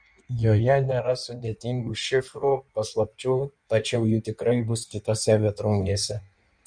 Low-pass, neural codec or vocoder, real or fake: 9.9 kHz; codec, 16 kHz in and 24 kHz out, 1.1 kbps, FireRedTTS-2 codec; fake